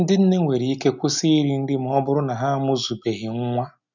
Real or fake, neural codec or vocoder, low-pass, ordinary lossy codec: real; none; 7.2 kHz; none